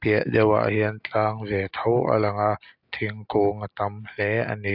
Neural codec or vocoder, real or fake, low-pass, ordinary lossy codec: none; real; 5.4 kHz; AAC, 48 kbps